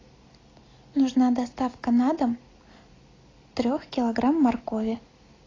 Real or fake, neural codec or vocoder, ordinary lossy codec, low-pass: real; none; AAC, 32 kbps; 7.2 kHz